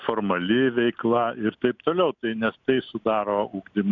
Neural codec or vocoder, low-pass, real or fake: none; 7.2 kHz; real